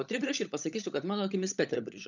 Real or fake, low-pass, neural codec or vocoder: fake; 7.2 kHz; codec, 16 kHz, 16 kbps, FunCodec, trained on LibriTTS, 50 frames a second